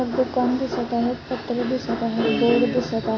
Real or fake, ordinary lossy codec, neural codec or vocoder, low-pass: real; AAC, 32 kbps; none; 7.2 kHz